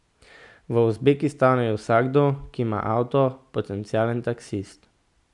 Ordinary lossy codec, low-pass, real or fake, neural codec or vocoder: none; 10.8 kHz; real; none